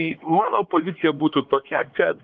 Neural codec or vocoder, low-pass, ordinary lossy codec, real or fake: codec, 16 kHz, 4 kbps, X-Codec, HuBERT features, trained on LibriSpeech; 7.2 kHz; Opus, 24 kbps; fake